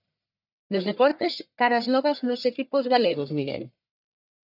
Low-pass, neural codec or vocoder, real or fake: 5.4 kHz; codec, 44.1 kHz, 1.7 kbps, Pupu-Codec; fake